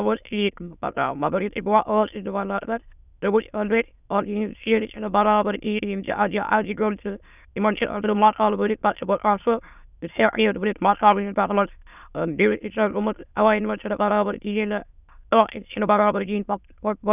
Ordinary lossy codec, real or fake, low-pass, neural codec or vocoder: none; fake; 3.6 kHz; autoencoder, 22.05 kHz, a latent of 192 numbers a frame, VITS, trained on many speakers